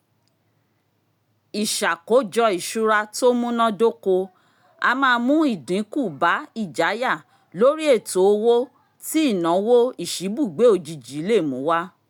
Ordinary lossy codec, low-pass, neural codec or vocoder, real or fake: none; 19.8 kHz; none; real